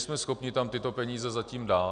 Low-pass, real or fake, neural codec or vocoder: 9.9 kHz; real; none